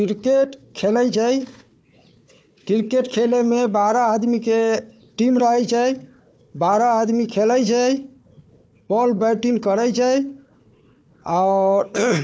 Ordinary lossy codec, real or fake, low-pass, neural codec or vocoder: none; fake; none; codec, 16 kHz, 4 kbps, FunCodec, trained on Chinese and English, 50 frames a second